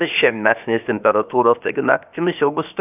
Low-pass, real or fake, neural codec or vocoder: 3.6 kHz; fake; codec, 16 kHz, about 1 kbps, DyCAST, with the encoder's durations